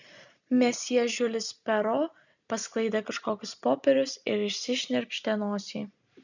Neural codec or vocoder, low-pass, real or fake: none; 7.2 kHz; real